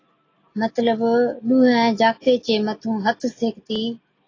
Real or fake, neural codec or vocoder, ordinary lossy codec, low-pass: real; none; AAC, 32 kbps; 7.2 kHz